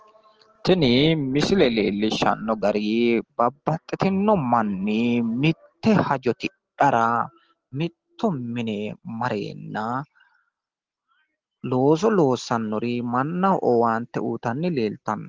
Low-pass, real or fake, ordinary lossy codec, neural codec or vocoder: 7.2 kHz; real; Opus, 16 kbps; none